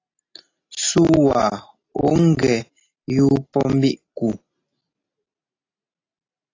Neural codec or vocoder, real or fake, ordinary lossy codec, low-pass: none; real; AAC, 32 kbps; 7.2 kHz